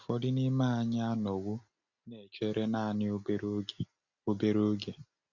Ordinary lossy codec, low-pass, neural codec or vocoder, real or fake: none; 7.2 kHz; none; real